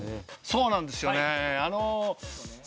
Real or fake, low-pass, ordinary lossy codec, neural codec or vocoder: real; none; none; none